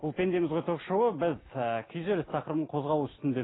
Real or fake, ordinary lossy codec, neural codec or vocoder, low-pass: real; AAC, 16 kbps; none; 7.2 kHz